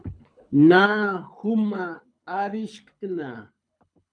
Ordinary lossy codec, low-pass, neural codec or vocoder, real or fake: MP3, 96 kbps; 9.9 kHz; codec, 24 kHz, 6 kbps, HILCodec; fake